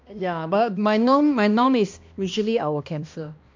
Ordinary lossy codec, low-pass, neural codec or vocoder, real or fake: MP3, 64 kbps; 7.2 kHz; codec, 16 kHz, 1 kbps, X-Codec, HuBERT features, trained on balanced general audio; fake